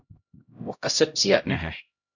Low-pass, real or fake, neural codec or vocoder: 7.2 kHz; fake; codec, 16 kHz, 0.5 kbps, X-Codec, HuBERT features, trained on LibriSpeech